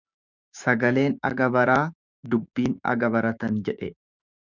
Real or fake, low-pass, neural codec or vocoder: fake; 7.2 kHz; codec, 16 kHz, 6 kbps, DAC